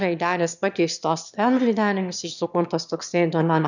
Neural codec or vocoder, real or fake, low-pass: autoencoder, 22.05 kHz, a latent of 192 numbers a frame, VITS, trained on one speaker; fake; 7.2 kHz